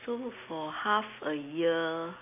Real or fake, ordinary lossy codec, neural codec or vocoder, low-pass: real; none; none; 3.6 kHz